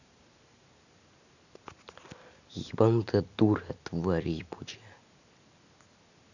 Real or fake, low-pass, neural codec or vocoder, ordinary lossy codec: real; 7.2 kHz; none; none